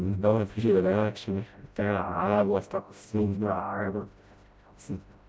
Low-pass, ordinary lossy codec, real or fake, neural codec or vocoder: none; none; fake; codec, 16 kHz, 0.5 kbps, FreqCodec, smaller model